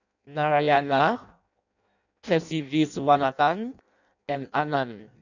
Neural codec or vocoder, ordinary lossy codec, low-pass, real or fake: codec, 16 kHz in and 24 kHz out, 0.6 kbps, FireRedTTS-2 codec; none; 7.2 kHz; fake